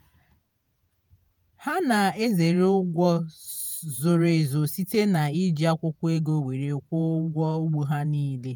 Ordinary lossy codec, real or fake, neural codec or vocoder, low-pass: none; fake; vocoder, 48 kHz, 128 mel bands, Vocos; none